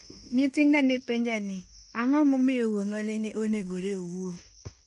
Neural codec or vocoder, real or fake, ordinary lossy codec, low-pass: codec, 16 kHz in and 24 kHz out, 0.9 kbps, LongCat-Audio-Codec, fine tuned four codebook decoder; fake; none; 10.8 kHz